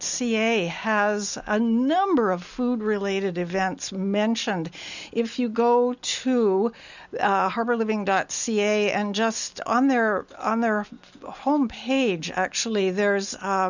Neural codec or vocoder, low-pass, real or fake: none; 7.2 kHz; real